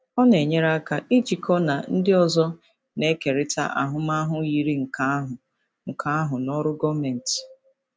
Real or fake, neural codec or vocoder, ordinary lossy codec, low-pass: real; none; none; none